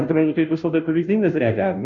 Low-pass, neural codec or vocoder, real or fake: 7.2 kHz; codec, 16 kHz, 0.5 kbps, FunCodec, trained on Chinese and English, 25 frames a second; fake